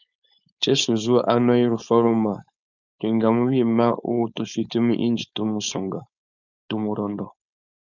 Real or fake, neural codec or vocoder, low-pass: fake; codec, 16 kHz, 4.8 kbps, FACodec; 7.2 kHz